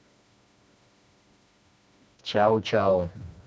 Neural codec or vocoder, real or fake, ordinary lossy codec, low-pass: codec, 16 kHz, 2 kbps, FreqCodec, smaller model; fake; none; none